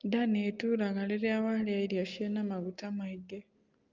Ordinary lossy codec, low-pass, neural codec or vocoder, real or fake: Opus, 32 kbps; 7.2 kHz; none; real